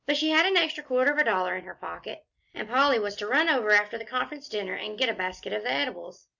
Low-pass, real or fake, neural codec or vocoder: 7.2 kHz; real; none